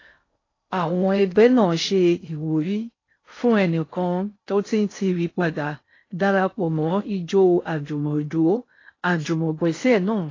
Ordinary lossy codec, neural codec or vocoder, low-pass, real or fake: AAC, 32 kbps; codec, 16 kHz in and 24 kHz out, 0.6 kbps, FocalCodec, streaming, 2048 codes; 7.2 kHz; fake